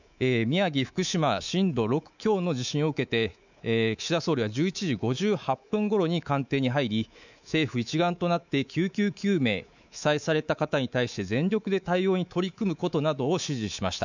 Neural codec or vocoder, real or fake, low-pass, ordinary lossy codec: codec, 24 kHz, 3.1 kbps, DualCodec; fake; 7.2 kHz; none